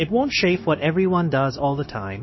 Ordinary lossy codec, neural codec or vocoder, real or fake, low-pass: MP3, 24 kbps; codec, 16 kHz, 0.9 kbps, LongCat-Audio-Codec; fake; 7.2 kHz